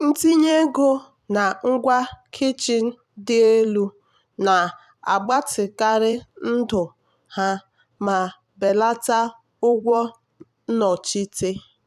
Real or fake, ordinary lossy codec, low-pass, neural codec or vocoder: fake; none; 14.4 kHz; vocoder, 44.1 kHz, 128 mel bands every 256 samples, BigVGAN v2